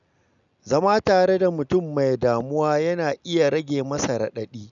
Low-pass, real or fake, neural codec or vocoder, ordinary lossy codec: 7.2 kHz; real; none; none